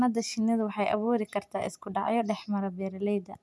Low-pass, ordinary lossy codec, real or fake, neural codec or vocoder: none; none; real; none